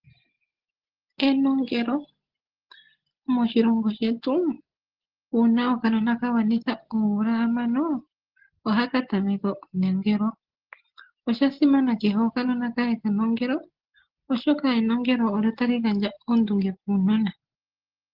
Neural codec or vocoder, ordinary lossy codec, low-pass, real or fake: none; Opus, 16 kbps; 5.4 kHz; real